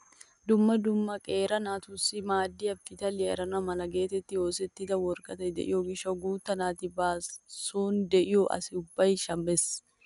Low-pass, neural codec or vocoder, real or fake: 10.8 kHz; none; real